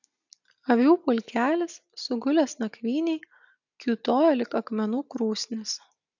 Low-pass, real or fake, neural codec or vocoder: 7.2 kHz; real; none